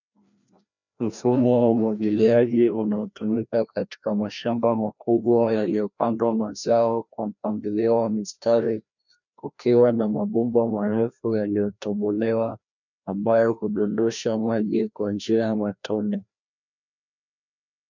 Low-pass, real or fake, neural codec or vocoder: 7.2 kHz; fake; codec, 16 kHz, 1 kbps, FreqCodec, larger model